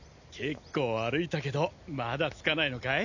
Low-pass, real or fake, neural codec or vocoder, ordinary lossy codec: 7.2 kHz; real; none; none